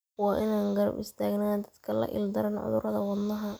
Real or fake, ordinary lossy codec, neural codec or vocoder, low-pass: real; none; none; none